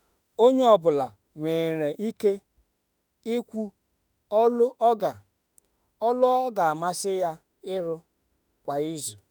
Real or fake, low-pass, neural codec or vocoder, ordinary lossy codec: fake; none; autoencoder, 48 kHz, 32 numbers a frame, DAC-VAE, trained on Japanese speech; none